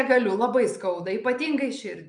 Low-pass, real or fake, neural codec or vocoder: 9.9 kHz; real; none